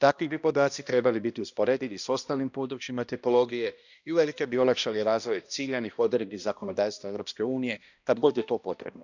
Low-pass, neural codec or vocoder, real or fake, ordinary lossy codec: 7.2 kHz; codec, 16 kHz, 1 kbps, X-Codec, HuBERT features, trained on balanced general audio; fake; none